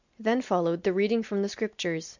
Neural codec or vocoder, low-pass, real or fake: none; 7.2 kHz; real